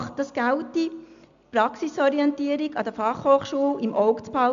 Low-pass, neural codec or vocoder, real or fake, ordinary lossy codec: 7.2 kHz; none; real; AAC, 96 kbps